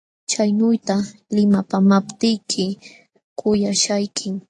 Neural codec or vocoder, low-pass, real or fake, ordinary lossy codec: none; 10.8 kHz; real; AAC, 48 kbps